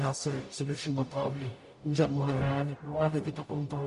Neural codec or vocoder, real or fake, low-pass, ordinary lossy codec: codec, 44.1 kHz, 0.9 kbps, DAC; fake; 14.4 kHz; MP3, 48 kbps